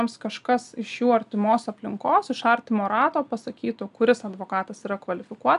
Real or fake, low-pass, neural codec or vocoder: real; 10.8 kHz; none